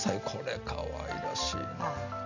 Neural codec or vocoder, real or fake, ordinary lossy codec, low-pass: none; real; none; 7.2 kHz